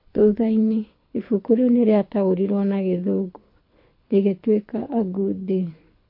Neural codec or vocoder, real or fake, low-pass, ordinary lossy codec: codec, 24 kHz, 6 kbps, HILCodec; fake; 5.4 kHz; MP3, 32 kbps